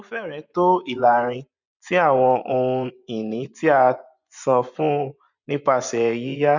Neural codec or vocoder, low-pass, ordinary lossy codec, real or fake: vocoder, 24 kHz, 100 mel bands, Vocos; 7.2 kHz; none; fake